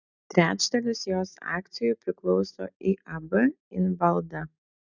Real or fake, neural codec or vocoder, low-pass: real; none; 7.2 kHz